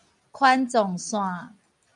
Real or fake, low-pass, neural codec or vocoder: real; 10.8 kHz; none